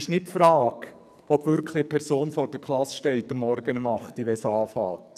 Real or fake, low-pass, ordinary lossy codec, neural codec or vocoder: fake; 14.4 kHz; none; codec, 44.1 kHz, 2.6 kbps, SNAC